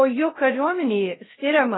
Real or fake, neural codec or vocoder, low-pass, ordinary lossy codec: fake; codec, 16 kHz, 0.3 kbps, FocalCodec; 7.2 kHz; AAC, 16 kbps